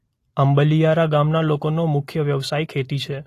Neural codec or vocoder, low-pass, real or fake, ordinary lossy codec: none; 14.4 kHz; real; AAC, 48 kbps